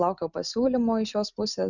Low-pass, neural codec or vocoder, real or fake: 7.2 kHz; none; real